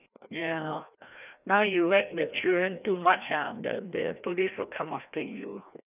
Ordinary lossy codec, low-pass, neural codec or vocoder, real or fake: none; 3.6 kHz; codec, 16 kHz, 1 kbps, FreqCodec, larger model; fake